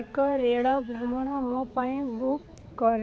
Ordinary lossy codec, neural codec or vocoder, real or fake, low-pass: none; codec, 16 kHz, 4 kbps, X-Codec, HuBERT features, trained on balanced general audio; fake; none